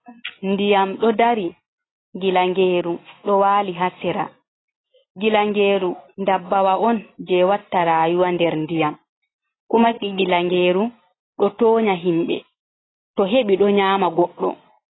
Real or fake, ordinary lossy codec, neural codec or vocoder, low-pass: real; AAC, 16 kbps; none; 7.2 kHz